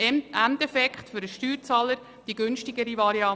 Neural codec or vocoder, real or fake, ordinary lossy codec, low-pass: none; real; none; none